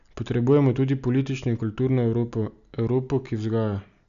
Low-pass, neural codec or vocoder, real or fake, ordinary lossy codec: 7.2 kHz; none; real; none